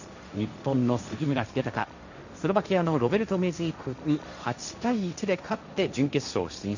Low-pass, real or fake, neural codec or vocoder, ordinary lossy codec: 7.2 kHz; fake; codec, 16 kHz, 1.1 kbps, Voila-Tokenizer; none